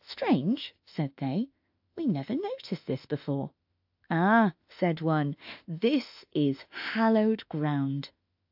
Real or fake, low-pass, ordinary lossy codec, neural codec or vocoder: fake; 5.4 kHz; AAC, 48 kbps; autoencoder, 48 kHz, 32 numbers a frame, DAC-VAE, trained on Japanese speech